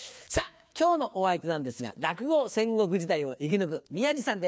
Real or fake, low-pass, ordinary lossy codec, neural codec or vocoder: fake; none; none; codec, 16 kHz, 2 kbps, FreqCodec, larger model